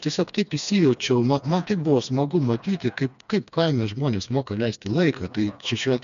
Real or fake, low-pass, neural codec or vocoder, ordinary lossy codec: fake; 7.2 kHz; codec, 16 kHz, 2 kbps, FreqCodec, smaller model; MP3, 96 kbps